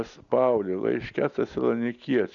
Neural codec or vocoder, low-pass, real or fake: none; 7.2 kHz; real